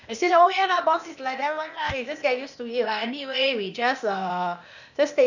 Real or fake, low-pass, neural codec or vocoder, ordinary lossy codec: fake; 7.2 kHz; codec, 16 kHz, 0.8 kbps, ZipCodec; none